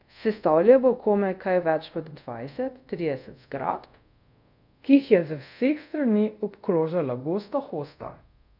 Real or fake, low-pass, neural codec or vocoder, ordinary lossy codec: fake; 5.4 kHz; codec, 24 kHz, 0.5 kbps, DualCodec; none